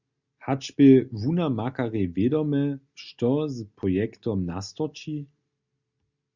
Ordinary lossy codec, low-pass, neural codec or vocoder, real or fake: Opus, 64 kbps; 7.2 kHz; none; real